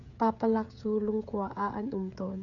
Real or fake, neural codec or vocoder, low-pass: fake; codec, 16 kHz, 16 kbps, FreqCodec, smaller model; 7.2 kHz